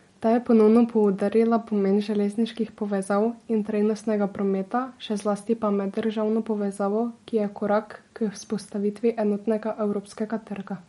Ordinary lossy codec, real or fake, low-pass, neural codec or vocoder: MP3, 48 kbps; real; 19.8 kHz; none